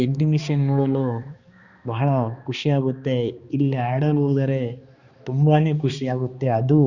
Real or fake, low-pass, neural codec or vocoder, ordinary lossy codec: fake; 7.2 kHz; codec, 16 kHz, 2 kbps, X-Codec, HuBERT features, trained on balanced general audio; Opus, 64 kbps